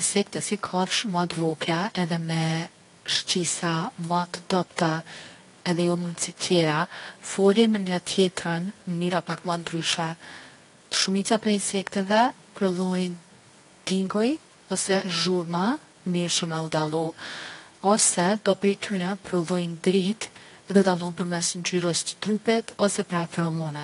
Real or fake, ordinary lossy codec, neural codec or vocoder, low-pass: fake; AAC, 48 kbps; codec, 24 kHz, 0.9 kbps, WavTokenizer, medium music audio release; 10.8 kHz